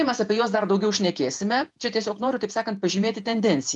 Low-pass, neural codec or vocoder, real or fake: 10.8 kHz; vocoder, 48 kHz, 128 mel bands, Vocos; fake